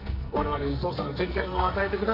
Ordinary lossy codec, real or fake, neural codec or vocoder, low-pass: none; fake; codec, 44.1 kHz, 2.6 kbps, SNAC; 5.4 kHz